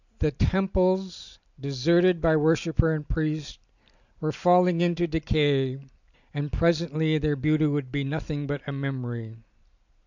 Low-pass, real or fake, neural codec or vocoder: 7.2 kHz; real; none